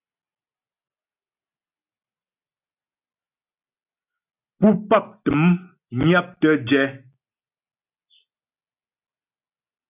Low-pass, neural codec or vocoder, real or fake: 3.6 kHz; none; real